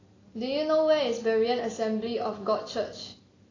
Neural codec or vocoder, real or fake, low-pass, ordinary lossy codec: none; real; 7.2 kHz; AAC, 32 kbps